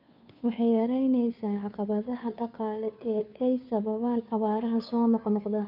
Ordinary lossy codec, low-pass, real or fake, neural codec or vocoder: none; 5.4 kHz; fake; codec, 16 kHz, 2 kbps, FunCodec, trained on Chinese and English, 25 frames a second